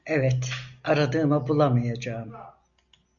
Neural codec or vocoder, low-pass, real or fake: none; 7.2 kHz; real